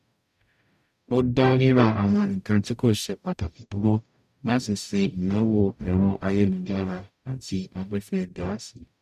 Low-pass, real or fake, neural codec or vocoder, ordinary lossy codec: 14.4 kHz; fake; codec, 44.1 kHz, 0.9 kbps, DAC; none